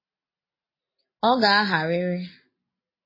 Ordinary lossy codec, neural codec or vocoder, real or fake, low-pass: MP3, 24 kbps; none; real; 5.4 kHz